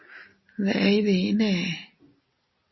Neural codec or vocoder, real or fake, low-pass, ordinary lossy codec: none; real; 7.2 kHz; MP3, 24 kbps